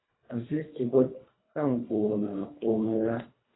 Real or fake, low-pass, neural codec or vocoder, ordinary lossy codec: fake; 7.2 kHz; codec, 24 kHz, 1.5 kbps, HILCodec; AAC, 16 kbps